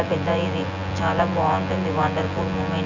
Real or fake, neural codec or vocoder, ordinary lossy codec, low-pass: fake; vocoder, 24 kHz, 100 mel bands, Vocos; none; 7.2 kHz